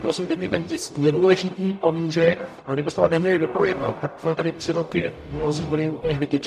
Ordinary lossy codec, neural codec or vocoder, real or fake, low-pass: MP3, 96 kbps; codec, 44.1 kHz, 0.9 kbps, DAC; fake; 14.4 kHz